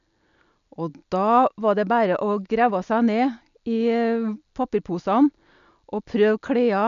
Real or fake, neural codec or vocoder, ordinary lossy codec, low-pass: real; none; none; 7.2 kHz